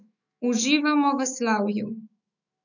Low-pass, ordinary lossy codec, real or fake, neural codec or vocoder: 7.2 kHz; none; fake; autoencoder, 48 kHz, 128 numbers a frame, DAC-VAE, trained on Japanese speech